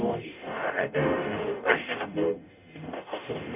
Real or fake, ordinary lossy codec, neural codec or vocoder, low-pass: fake; none; codec, 44.1 kHz, 0.9 kbps, DAC; 3.6 kHz